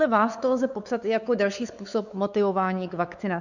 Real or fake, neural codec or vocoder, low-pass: fake; codec, 16 kHz, 4 kbps, X-Codec, WavLM features, trained on Multilingual LibriSpeech; 7.2 kHz